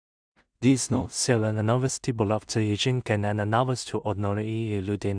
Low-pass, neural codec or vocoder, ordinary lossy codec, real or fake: 9.9 kHz; codec, 16 kHz in and 24 kHz out, 0.4 kbps, LongCat-Audio-Codec, two codebook decoder; Opus, 64 kbps; fake